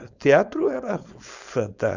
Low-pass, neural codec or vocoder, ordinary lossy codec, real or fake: 7.2 kHz; codec, 16 kHz, 4.8 kbps, FACodec; Opus, 64 kbps; fake